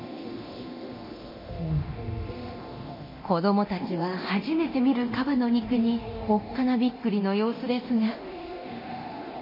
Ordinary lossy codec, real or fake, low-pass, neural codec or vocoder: MP3, 24 kbps; fake; 5.4 kHz; codec, 24 kHz, 0.9 kbps, DualCodec